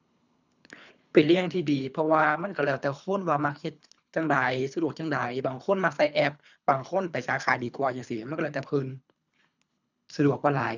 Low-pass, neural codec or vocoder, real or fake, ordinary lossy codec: 7.2 kHz; codec, 24 kHz, 3 kbps, HILCodec; fake; none